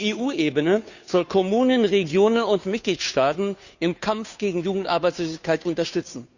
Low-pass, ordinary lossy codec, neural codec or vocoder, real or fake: 7.2 kHz; none; codec, 16 kHz, 2 kbps, FunCodec, trained on Chinese and English, 25 frames a second; fake